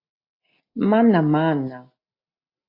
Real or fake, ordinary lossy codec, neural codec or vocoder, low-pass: real; AAC, 32 kbps; none; 5.4 kHz